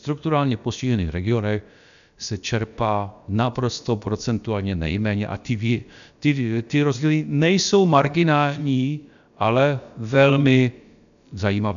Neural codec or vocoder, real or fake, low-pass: codec, 16 kHz, about 1 kbps, DyCAST, with the encoder's durations; fake; 7.2 kHz